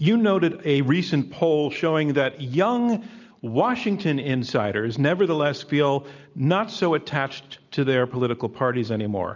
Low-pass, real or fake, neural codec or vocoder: 7.2 kHz; real; none